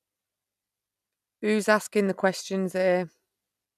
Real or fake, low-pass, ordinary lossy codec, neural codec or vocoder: real; 14.4 kHz; none; none